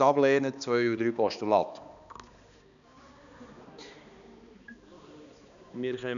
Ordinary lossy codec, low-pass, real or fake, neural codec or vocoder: none; 7.2 kHz; fake; codec, 16 kHz, 2 kbps, X-Codec, HuBERT features, trained on balanced general audio